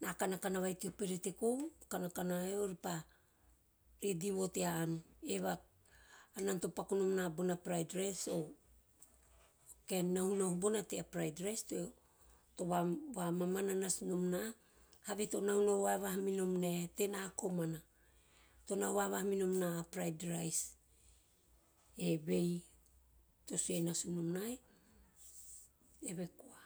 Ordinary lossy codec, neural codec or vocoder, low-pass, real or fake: none; none; none; real